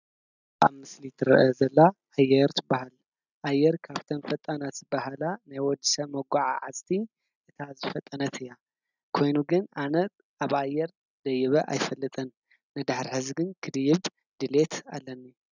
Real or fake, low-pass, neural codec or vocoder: real; 7.2 kHz; none